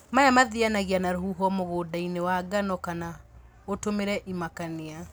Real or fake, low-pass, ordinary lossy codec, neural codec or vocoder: real; none; none; none